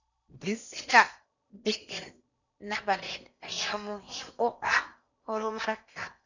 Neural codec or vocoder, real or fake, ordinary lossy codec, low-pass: codec, 16 kHz in and 24 kHz out, 0.8 kbps, FocalCodec, streaming, 65536 codes; fake; none; 7.2 kHz